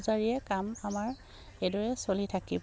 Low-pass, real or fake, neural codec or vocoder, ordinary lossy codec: none; real; none; none